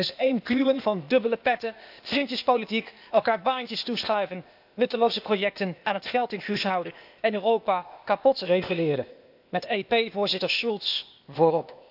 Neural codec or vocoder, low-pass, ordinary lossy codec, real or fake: codec, 16 kHz, 0.8 kbps, ZipCodec; 5.4 kHz; AAC, 48 kbps; fake